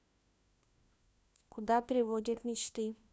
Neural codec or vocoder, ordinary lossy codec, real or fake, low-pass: codec, 16 kHz, 1 kbps, FunCodec, trained on LibriTTS, 50 frames a second; none; fake; none